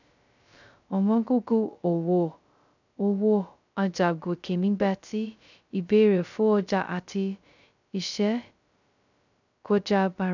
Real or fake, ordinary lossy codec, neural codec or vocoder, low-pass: fake; none; codec, 16 kHz, 0.2 kbps, FocalCodec; 7.2 kHz